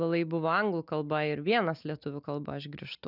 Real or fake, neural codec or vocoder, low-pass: real; none; 5.4 kHz